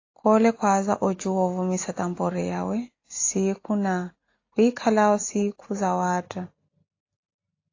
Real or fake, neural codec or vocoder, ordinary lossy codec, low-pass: real; none; AAC, 32 kbps; 7.2 kHz